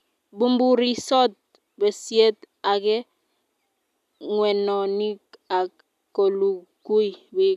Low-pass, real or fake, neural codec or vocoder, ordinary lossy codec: 14.4 kHz; real; none; none